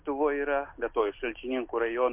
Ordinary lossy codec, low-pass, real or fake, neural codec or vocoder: MP3, 32 kbps; 3.6 kHz; real; none